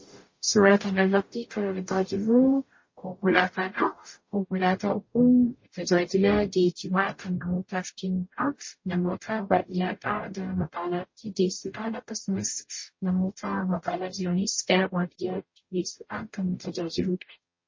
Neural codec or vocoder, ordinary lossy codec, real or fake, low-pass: codec, 44.1 kHz, 0.9 kbps, DAC; MP3, 32 kbps; fake; 7.2 kHz